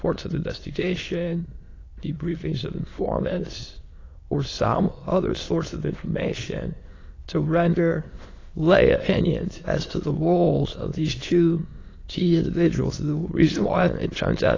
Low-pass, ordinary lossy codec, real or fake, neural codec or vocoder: 7.2 kHz; AAC, 32 kbps; fake; autoencoder, 22.05 kHz, a latent of 192 numbers a frame, VITS, trained on many speakers